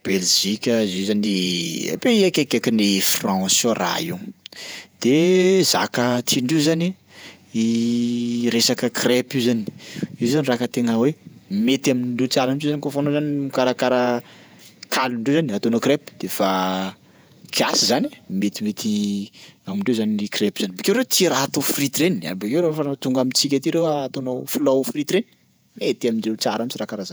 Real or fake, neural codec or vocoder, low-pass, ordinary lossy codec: fake; vocoder, 48 kHz, 128 mel bands, Vocos; none; none